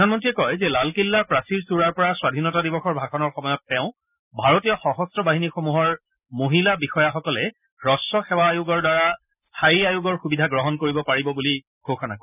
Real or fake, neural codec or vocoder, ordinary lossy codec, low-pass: real; none; none; 3.6 kHz